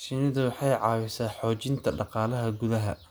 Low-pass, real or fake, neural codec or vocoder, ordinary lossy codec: none; real; none; none